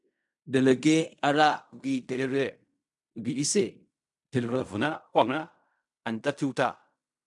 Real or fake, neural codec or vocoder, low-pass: fake; codec, 16 kHz in and 24 kHz out, 0.4 kbps, LongCat-Audio-Codec, fine tuned four codebook decoder; 10.8 kHz